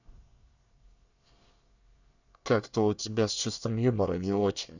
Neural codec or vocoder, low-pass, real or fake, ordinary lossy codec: codec, 24 kHz, 1 kbps, SNAC; 7.2 kHz; fake; none